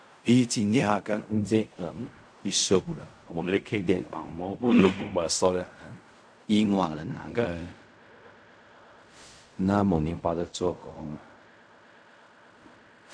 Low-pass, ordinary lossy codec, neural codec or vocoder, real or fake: 9.9 kHz; none; codec, 16 kHz in and 24 kHz out, 0.4 kbps, LongCat-Audio-Codec, fine tuned four codebook decoder; fake